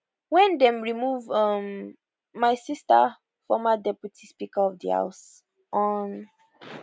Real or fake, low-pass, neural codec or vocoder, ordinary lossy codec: real; none; none; none